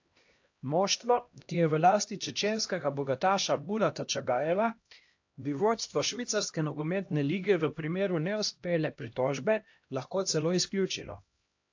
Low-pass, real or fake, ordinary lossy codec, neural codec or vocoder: 7.2 kHz; fake; AAC, 48 kbps; codec, 16 kHz, 1 kbps, X-Codec, HuBERT features, trained on LibriSpeech